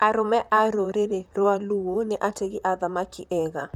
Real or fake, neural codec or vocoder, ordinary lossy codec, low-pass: fake; vocoder, 44.1 kHz, 128 mel bands, Pupu-Vocoder; none; 19.8 kHz